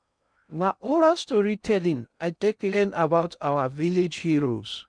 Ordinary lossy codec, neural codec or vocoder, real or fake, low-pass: none; codec, 16 kHz in and 24 kHz out, 0.6 kbps, FocalCodec, streaming, 2048 codes; fake; 9.9 kHz